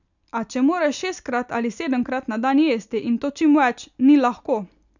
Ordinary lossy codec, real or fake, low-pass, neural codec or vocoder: none; real; 7.2 kHz; none